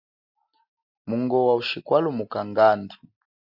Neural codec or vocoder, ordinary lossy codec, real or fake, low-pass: none; AAC, 48 kbps; real; 5.4 kHz